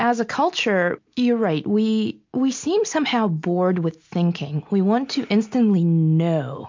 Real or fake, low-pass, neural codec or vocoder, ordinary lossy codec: real; 7.2 kHz; none; MP3, 48 kbps